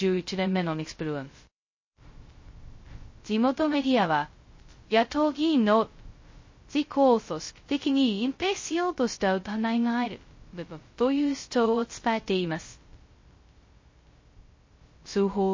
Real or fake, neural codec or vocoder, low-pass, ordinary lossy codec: fake; codec, 16 kHz, 0.2 kbps, FocalCodec; 7.2 kHz; MP3, 32 kbps